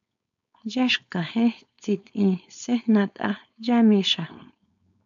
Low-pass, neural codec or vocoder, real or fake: 7.2 kHz; codec, 16 kHz, 4.8 kbps, FACodec; fake